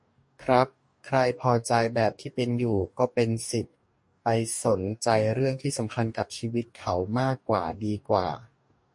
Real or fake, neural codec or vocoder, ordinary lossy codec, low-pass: fake; codec, 44.1 kHz, 2.6 kbps, DAC; MP3, 48 kbps; 10.8 kHz